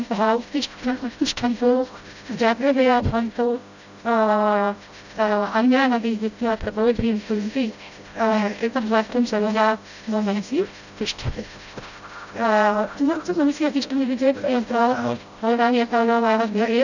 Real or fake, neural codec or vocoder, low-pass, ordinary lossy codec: fake; codec, 16 kHz, 0.5 kbps, FreqCodec, smaller model; 7.2 kHz; none